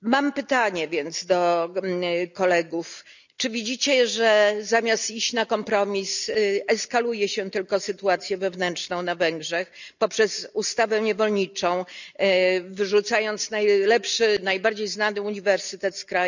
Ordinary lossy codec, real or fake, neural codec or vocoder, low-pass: none; real; none; 7.2 kHz